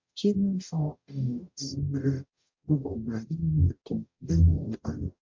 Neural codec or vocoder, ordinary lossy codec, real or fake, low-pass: codec, 44.1 kHz, 0.9 kbps, DAC; MP3, 48 kbps; fake; 7.2 kHz